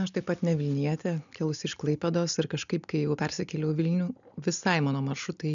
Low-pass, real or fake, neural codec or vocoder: 7.2 kHz; real; none